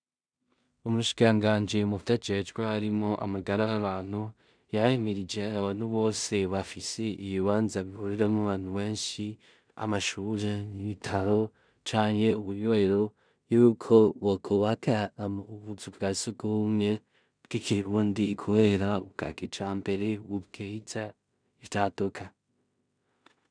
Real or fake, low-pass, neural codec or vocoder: fake; 9.9 kHz; codec, 16 kHz in and 24 kHz out, 0.4 kbps, LongCat-Audio-Codec, two codebook decoder